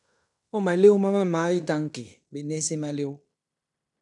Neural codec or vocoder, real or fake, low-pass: codec, 16 kHz in and 24 kHz out, 0.9 kbps, LongCat-Audio-Codec, fine tuned four codebook decoder; fake; 10.8 kHz